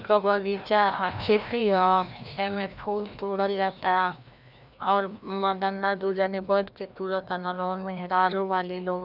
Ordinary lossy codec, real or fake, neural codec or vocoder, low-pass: none; fake; codec, 16 kHz, 1 kbps, FreqCodec, larger model; 5.4 kHz